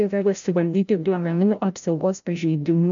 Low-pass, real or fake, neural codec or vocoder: 7.2 kHz; fake; codec, 16 kHz, 0.5 kbps, FreqCodec, larger model